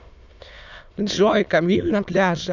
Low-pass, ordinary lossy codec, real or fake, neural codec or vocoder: 7.2 kHz; none; fake; autoencoder, 22.05 kHz, a latent of 192 numbers a frame, VITS, trained on many speakers